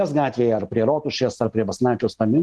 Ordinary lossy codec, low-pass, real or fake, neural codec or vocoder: Opus, 16 kbps; 10.8 kHz; real; none